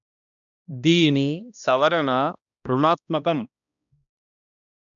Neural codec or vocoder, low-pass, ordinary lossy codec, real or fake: codec, 16 kHz, 1 kbps, X-Codec, HuBERT features, trained on balanced general audio; 7.2 kHz; none; fake